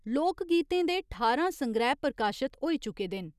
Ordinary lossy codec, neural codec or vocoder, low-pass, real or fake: none; none; 14.4 kHz; real